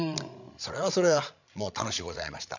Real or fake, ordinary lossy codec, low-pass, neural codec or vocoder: fake; none; 7.2 kHz; codec, 16 kHz, 16 kbps, FreqCodec, larger model